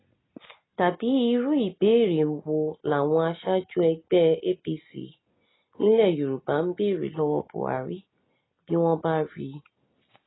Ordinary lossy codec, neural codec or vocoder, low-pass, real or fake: AAC, 16 kbps; none; 7.2 kHz; real